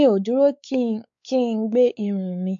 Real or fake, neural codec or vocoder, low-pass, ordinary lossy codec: fake; codec, 16 kHz, 4 kbps, X-Codec, WavLM features, trained on Multilingual LibriSpeech; 7.2 kHz; MP3, 48 kbps